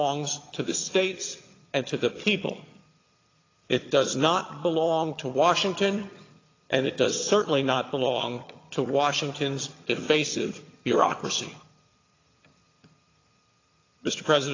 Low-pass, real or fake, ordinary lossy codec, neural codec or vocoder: 7.2 kHz; fake; AAC, 32 kbps; vocoder, 22.05 kHz, 80 mel bands, HiFi-GAN